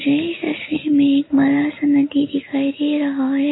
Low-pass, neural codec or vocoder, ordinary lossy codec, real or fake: 7.2 kHz; none; AAC, 16 kbps; real